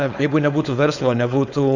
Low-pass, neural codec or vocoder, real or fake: 7.2 kHz; codec, 16 kHz, 4.8 kbps, FACodec; fake